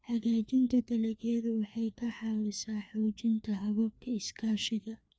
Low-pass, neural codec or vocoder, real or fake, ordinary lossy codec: none; codec, 16 kHz, 2 kbps, FreqCodec, larger model; fake; none